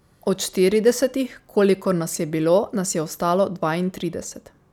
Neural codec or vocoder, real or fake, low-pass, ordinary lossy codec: none; real; 19.8 kHz; none